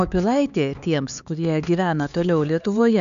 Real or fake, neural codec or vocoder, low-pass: fake; codec, 16 kHz, 4 kbps, X-Codec, HuBERT features, trained on LibriSpeech; 7.2 kHz